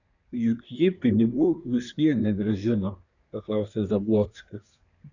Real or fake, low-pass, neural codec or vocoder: fake; 7.2 kHz; codec, 24 kHz, 1 kbps, SNAC